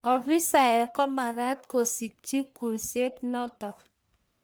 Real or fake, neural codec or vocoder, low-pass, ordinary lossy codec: fake; codec, 44.1 kHz, 1.7 kbps, Pupu-Codec; none; none